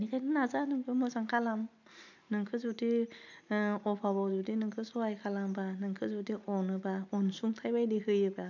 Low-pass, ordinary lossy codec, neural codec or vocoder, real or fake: 7.2 kHz; none; none; real